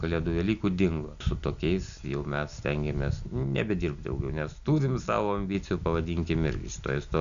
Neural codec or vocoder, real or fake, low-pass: none; real; 7.2 kHz